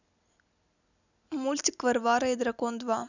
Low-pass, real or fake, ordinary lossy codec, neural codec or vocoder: 7.2 kHz; real; none; none